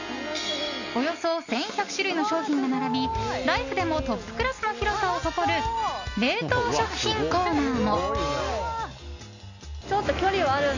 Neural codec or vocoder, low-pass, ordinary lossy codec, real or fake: none; 7.2 kHz; none; real